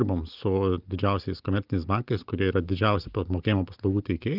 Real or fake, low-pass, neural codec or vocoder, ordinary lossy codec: real; 5.4 kHz; none; Opus, 32 kbps